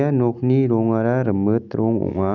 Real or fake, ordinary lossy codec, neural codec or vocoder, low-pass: real; none; none; 7.2 kHz